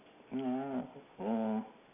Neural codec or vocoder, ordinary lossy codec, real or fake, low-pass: none; none; real; 3.6 kHz